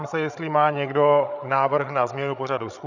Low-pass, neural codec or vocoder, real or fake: 7.2 kHz; codec, 16 kHz, 16 kbps, FreqCodec, larger model; fake